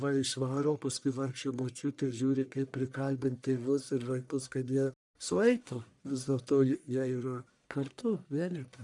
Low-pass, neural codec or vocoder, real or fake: 10.8 kHz; codec, 44.1 kHz, 1.7 kbps, Pupu-Codec; fake